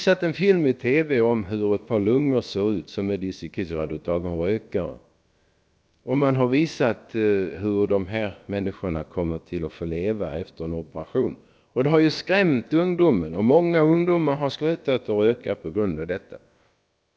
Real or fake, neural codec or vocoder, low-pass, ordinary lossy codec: fake; codec, 16 kHz, about 1 kbps, DyCAST, with the encoder's durations; none; none